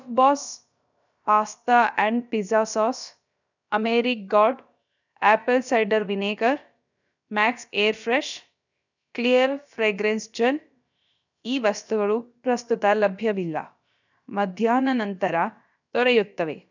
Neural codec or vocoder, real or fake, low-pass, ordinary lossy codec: codec, 16 kHz, about 1 kbps, DyCAST, with the encoder's durations; fake; 7.2 kHz; none